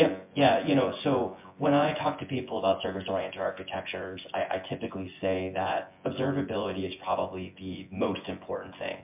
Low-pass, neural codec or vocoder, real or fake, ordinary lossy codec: 3.6 kHz; vocoder, 24 kHz, 100 mel bands, Vocos; fake; MP3, 32 kbps